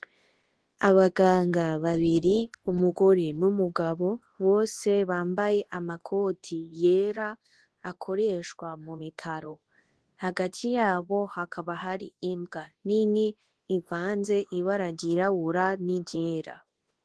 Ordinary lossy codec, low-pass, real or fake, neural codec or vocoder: Opus, 16 kbps; 10.8 kHz; fake; codec, 24 kHz, 0.9 kbps, WavTokenizer, large speech release